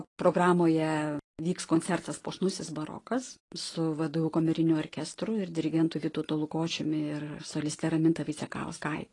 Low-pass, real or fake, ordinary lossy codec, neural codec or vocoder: 10.8 kHz; real; AAC, 32 kbps; none